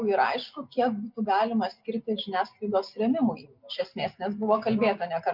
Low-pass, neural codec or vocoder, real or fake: 5.4 kHz; none; real